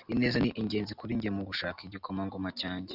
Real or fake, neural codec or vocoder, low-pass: real; none; 5.4 kHz